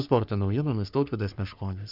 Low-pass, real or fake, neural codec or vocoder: 5.4 kHz; fake; codec, 44.1 kHz, 3.4 kbps, Pupu-Codec